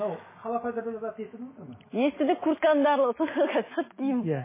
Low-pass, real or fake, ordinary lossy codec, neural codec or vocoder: 3.6 kHz; fake; MP3, 16 kbps; autoencoder, 48 kHz, 128 numbers a frame, DAC-VAE, trained on Japanese speech